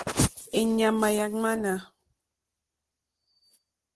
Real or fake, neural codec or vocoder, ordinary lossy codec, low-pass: real; none; Opus, 16 kbps; 10.8 kHz